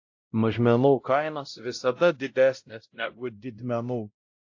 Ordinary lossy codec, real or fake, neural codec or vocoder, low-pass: AAC, 48 kbps; fake; codec, 16 kHz, 0.5 kbps, X-Codec, WavLM features, trained on Multilingual LibriSpeech; 7.2 kHz